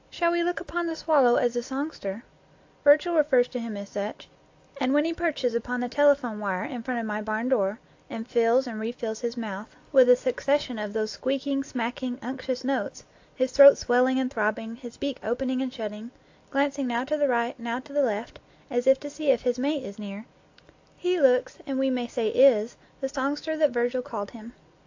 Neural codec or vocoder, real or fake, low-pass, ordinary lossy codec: none; real; 7.2 kHz; AAC, 48 kbps